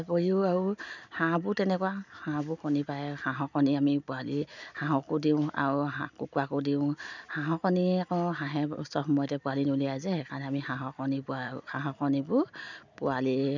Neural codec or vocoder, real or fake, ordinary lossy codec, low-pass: none; real; none; 7.2 kHz